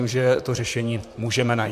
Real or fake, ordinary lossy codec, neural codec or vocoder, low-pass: fake; MP3, 96 kbps; vocoder, 44.1 kHz, 128 mel bands, Pupu-Vocoder; 14.4 kHz